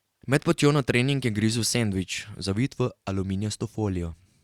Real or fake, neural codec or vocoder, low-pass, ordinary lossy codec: real; none; 19.8 kHz; Opus, 64 kbps